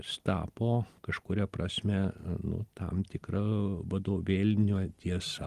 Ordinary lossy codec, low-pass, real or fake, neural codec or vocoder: Opus, 24 kbps; 14.4 kHz; real; none